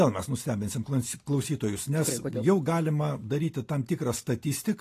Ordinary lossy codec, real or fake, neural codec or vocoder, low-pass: AAC, 48 kbps; real; none; 14.4 kHz